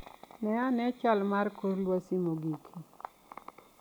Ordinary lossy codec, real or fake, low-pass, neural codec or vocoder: none; real; none; none